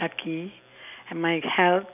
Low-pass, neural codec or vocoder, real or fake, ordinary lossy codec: 3.6 kHz; none; real; none